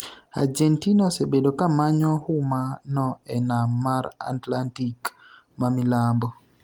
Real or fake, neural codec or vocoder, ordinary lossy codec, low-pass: real; none; Opus, 32 kbps; 19.8 kHz